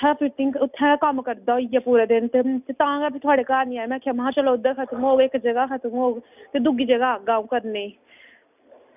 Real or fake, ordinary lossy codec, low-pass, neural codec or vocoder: real; none; 3.6 kHz; none